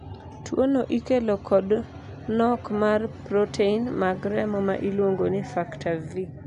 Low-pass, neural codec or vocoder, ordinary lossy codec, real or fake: 10.8 kHz; none; none; real